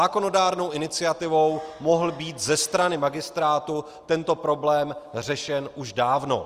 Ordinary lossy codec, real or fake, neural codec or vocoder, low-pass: Opus, 32 kbps; real; none; 14.4 kHz